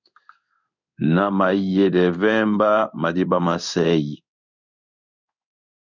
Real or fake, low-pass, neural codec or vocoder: fake; 7.2 kHz; codec, 16 kHz in and 24 kHz out, 1 kbps, XY-Tokenizer